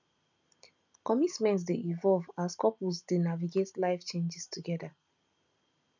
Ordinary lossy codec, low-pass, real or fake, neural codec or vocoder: none; 7.2 kHz; real; none